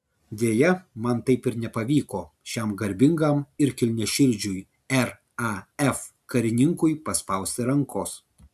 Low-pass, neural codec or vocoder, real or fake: 14.4 kHz; none; real